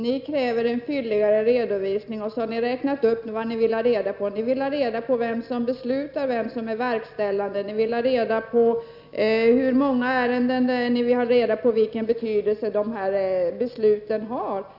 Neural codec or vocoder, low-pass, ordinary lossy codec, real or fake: none; 5.4 kHz; none; real